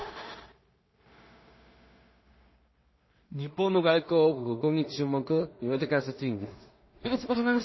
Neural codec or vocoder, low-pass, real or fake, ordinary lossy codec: codec, 16 kHz in and 24 kHz out, 0.4 kbps, LongCat-Audio-Codec, two codebook decoder; 7.2 kHz; fake; MP3, 24 kbps